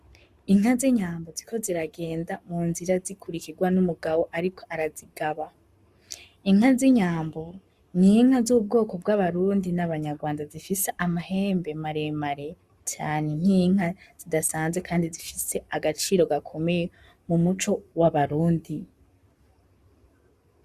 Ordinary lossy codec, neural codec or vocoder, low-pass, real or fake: Opus, 64 kbps; codec, 44.1 kHz, 7.8 kbps, Pupu-Codec; 14.4 kHz; fake